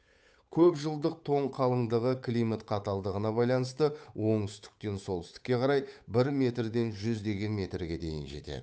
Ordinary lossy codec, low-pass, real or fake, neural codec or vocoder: none; none; fake; codec, 16 kHz, 8 kbps, FunCodec, trained on Chinese and English, 25 frames a second